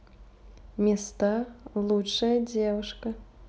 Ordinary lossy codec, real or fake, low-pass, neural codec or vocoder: none; real; none; none